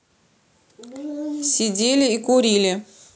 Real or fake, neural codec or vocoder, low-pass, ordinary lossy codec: real; none; none; none